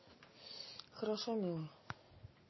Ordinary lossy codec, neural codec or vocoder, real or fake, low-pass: MP3, 24 kbps; none; real; 7.2 kHz